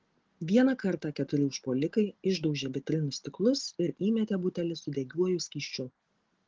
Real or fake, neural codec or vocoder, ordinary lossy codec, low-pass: real; none; Opus, 16 kbps; 7.2 kHz